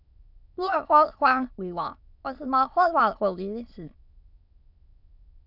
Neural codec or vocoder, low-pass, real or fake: autoencoder, 22.05 kHz, a latent of 192 numbers a frame, VITS, trained on many speakers; 5.4 kHz; fake